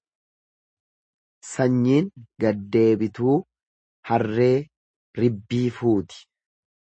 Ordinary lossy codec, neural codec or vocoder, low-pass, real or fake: MP3, 32 kbps; none; 9.9 kHz; real